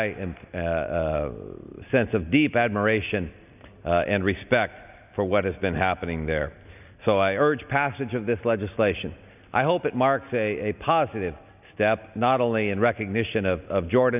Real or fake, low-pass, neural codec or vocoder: real; 3.6 kHz; none